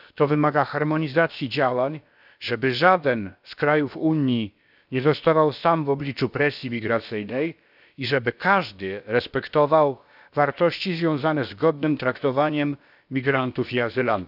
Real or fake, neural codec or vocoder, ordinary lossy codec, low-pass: fake; codec, 16 kHz, about 1 kbps, DyCAST, with the encoder's durations; none; 5.4 kHz